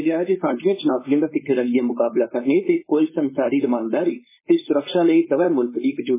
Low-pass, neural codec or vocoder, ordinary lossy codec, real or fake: 3.6 kHz; codec, 16 kHz, 4.8 kbps, FACodec; MP3, 16 kbps; fake